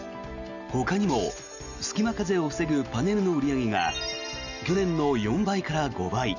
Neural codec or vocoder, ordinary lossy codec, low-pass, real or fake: none; none; 7.2 kHz; real